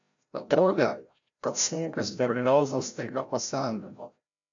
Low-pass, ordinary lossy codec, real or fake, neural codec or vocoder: 7.2 kHz; AAC, 64 kbps; fake; codec, 16 kHz, 0.5 kbps, FreqCodec, larger model